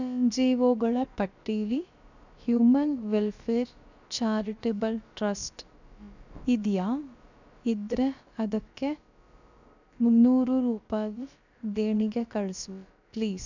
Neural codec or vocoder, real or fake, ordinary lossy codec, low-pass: codec, 16 kHz, about 1 kbps, DyCAST, with the encoder's durations; fake; none; 7.2 kHz